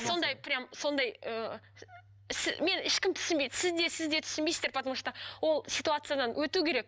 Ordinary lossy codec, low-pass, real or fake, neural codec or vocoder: none; none; real; none